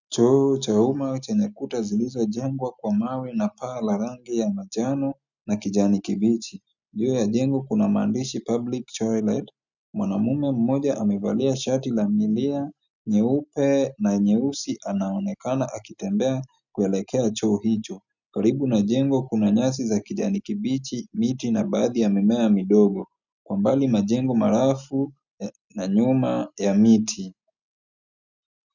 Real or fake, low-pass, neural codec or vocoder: real; 7.2 kHz; none